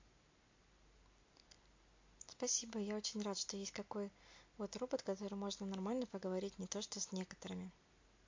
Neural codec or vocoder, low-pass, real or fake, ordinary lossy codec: none; 7.2 kHz; real; MP3, 48 kbps